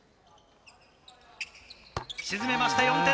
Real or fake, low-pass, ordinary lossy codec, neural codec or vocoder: real; none; none; none